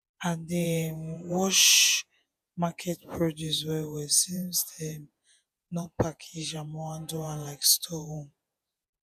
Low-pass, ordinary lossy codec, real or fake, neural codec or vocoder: 14.4 kHz; none; fake; vocoder, 48 kHz, 128 mel bands, Vocos